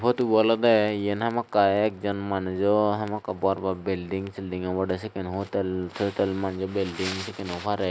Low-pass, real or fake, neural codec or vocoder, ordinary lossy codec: none; real; none; none